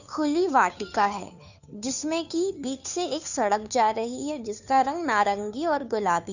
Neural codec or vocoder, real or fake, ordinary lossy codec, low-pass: codec, 16 kHz, 2 kbps, FunCodec, trained on Chinese and English, 25 frames a second; fake; none; 7.2 kHz